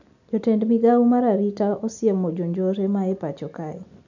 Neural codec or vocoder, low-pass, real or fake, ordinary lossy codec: none; 7.2 kHz; real; none